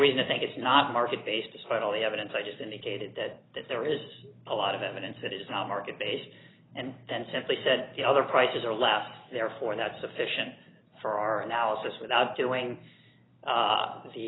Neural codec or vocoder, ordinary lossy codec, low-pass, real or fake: none; AAC, 16 kbps; 7.2 kHz; real